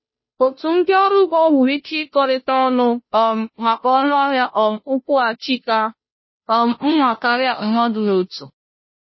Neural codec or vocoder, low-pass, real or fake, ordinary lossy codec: codec, 16 kHz, 0.5 kbps, FunCodec, trained on Chinese and English, 25 frames a second; 7.2 kHz; fake; MP3, 24 kbps